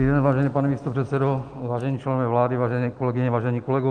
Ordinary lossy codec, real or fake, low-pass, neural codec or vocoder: Opus, 24 kbps; real; 9.9 kHz; none